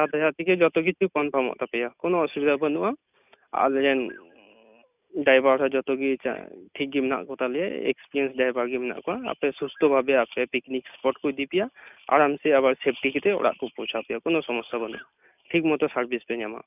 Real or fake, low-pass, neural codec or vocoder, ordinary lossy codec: real; 3.6 kHz; none; none